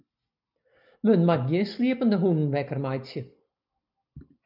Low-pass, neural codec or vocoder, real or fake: 5.4 kHz; none; real